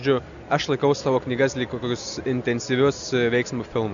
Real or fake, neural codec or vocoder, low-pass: real; none; 7.2 kHz